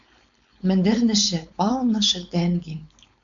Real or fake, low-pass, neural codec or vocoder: fake; 7.2 kHz; codec, 16 kHz, 4.8 kbps, FACodec